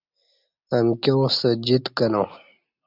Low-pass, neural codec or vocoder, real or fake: 5.4 kHz; none; real